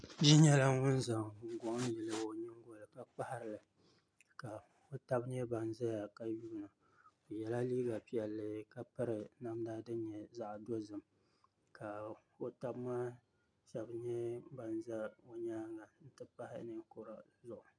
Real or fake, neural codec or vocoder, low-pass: real; none; 9.9 kHz